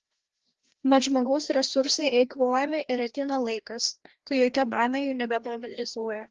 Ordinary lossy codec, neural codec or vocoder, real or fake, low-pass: Opus, 16 kbps; codec, 16 kHz, 1 kbps, FreqCodec, larger model; fake; 7.2 kHz